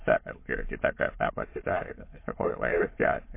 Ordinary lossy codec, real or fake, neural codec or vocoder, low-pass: MP3, 24 kbps; fake; autoencoder, 22.05 kHz, a latent of 192 numbers a frame, VITS, trained on many speakers; 3.6 kHz